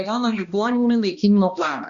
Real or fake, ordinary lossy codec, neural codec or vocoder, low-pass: fake; Opus, 64 kbps; codec, 16 kHz, 1 kbps, X-Codec, HuBERT features, trained on balanced general audio; 7.2 kHz